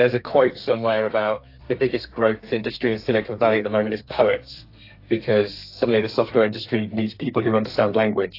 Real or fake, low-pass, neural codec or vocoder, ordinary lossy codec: fake; 5.4 kHz; codec, 32 kHz, 1.9 kbps, SNAC; AAC, 32 kbps